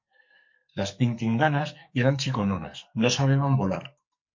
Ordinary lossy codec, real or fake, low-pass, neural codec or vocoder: MP3, 48 kbps; fake; 7.2 kHz; codec, 44.1 kHz, 2.6 kbps, SNAC